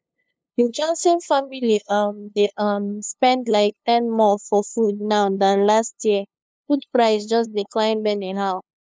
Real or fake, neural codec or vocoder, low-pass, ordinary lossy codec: fake; codec, 16 kHz, 2 kbps, FunCodec, trained on LibriTTS, 25 frames a second; none; none